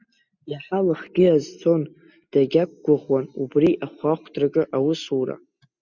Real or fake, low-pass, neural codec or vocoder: real; 7.2 kHz; none